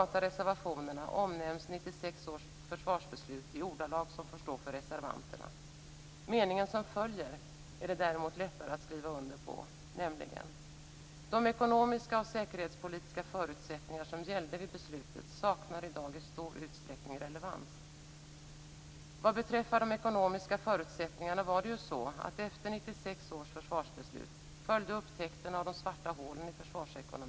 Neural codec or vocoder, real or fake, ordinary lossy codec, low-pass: none; real; none; none